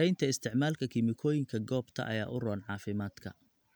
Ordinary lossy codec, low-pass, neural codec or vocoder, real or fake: none; none; none; real